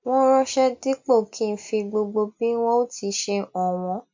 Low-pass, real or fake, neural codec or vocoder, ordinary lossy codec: 7.2 kHz; real; none; MP3, 48 kbps